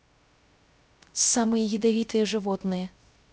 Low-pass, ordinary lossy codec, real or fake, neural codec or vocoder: none; none; fake; codec, 16 kHz, 0.3 kbps, FocalCodec